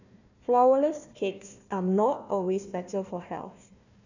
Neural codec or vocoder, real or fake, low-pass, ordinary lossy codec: codec, 16 kHz, 1 kbps, FunCodec, trained on Chinese and English, 50 frames a second; fake; 7.2 kHz; none